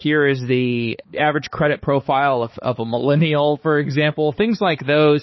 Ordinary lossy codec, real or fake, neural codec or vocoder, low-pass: MP3, 24 kbps; fake; codec, 16 kHz, 4 kbps, X-Codec, HuBERT features, trained on balanced general audio; 7.2 kHz